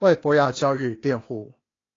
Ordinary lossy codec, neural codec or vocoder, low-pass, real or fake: AAC, 32 kbps; codec, 16 kHz, 0.8 kbps, ZipCodec; 7.2 kHz; fake